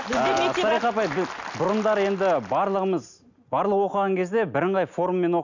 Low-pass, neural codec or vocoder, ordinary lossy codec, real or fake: 7.2 kHz; none; none; real